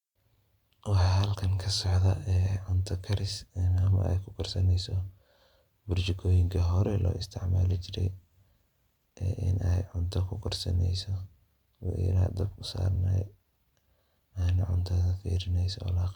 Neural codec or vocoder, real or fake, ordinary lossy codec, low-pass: vocoder, 44.1 kHz, 128 mel bands every 512 samples, BigVGAN v2; fake; Opus, 64 kbps; 19.8 kHz